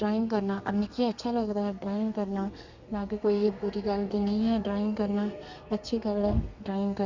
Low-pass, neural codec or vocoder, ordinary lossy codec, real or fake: 7.2 kHz; codec, 32 kHz, 1.9 kbps, SNAC; Opus, 64 kbps; fake